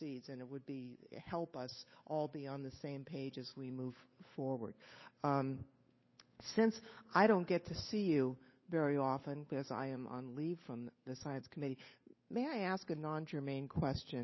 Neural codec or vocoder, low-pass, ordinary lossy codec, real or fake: codec, 16 kHz, 8 kbps, FunCodec, trained on Chinese and English, 25 frames a second; 7.2 kHz; MP3, 24 kbps; fake